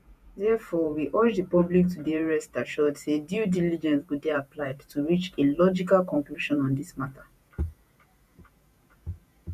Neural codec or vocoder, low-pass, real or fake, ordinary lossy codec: vocoder, 48 kHz, 128 mel bands, Vocos; 14.4 kHz; fake; none